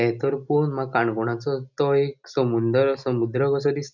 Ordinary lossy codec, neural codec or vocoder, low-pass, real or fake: none; none; 7.2 kHz; real